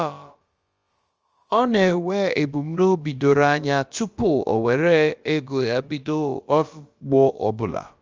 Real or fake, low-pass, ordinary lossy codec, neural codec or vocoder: fake; 7.2 kHz; Opus, 24 kbps; codec, 16 kHz, about 1 kbps, DyCAST, with the encoder's durations